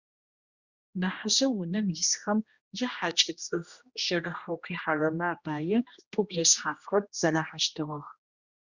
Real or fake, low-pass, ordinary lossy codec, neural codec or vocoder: fake; 7.2 kHz; Opus, 64 kbps; codec, 16 kHz, 1 kbps, X-Codec, HuBERT features, trained on general audio